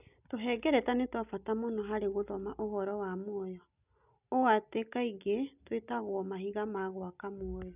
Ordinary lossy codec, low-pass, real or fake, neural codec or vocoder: none; 3.6 kHz; real; none